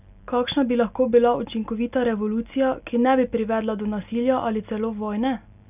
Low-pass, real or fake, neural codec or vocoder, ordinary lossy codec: 3.6 kHz; real; none; none